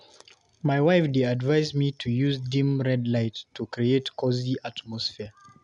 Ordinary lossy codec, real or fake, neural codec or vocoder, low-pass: none; real; none; 10.8 kHz